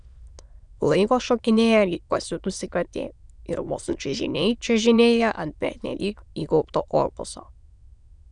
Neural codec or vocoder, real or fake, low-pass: autoencoder, 22.05 kHz, a latent of 192 numbers a frame, VITS, trained on many speakers; fake; 9.9 kHz